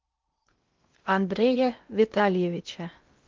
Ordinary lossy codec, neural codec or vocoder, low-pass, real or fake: Opus, 32 kbps; codec, 16 kHz in and 24 kHz out, 0.6 kbps, FocalCodec, streaming, 2048 codes; 7.2 kHz; fake